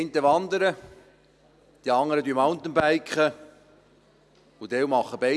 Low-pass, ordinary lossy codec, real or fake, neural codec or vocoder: none; none; real; none